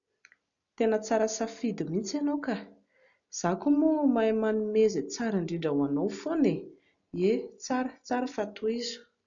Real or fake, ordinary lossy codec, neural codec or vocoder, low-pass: real; MP3, 96 kbps; none; 7.2 kHz